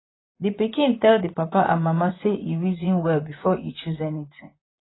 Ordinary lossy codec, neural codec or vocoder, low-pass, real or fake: AAC, 16 kbps; vocoder, 44.1 kHz, 128 mel bands, Pupu-Vocoder; 7.2 kHz; fake